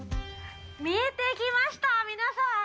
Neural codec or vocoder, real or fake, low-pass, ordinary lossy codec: none; real; none; none